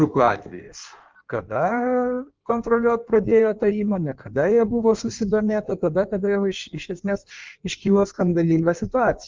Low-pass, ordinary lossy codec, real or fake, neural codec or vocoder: 7.2 kHz; Opus, 32 kbps; fake; codec, 16 kHz in and 24 kHz out, 1.1 kbps, FireRedTTS-2 codec